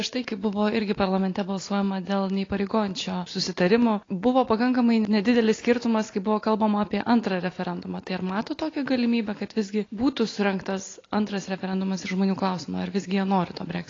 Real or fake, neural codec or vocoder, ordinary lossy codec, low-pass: real; none; AAC, 32 kbps; 7.2 kHz